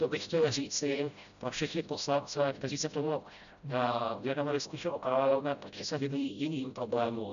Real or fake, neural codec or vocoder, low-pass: fake; codec, 16 kHz, 0.5 kbps, FreqCodec, smaller model; 7.2 kHz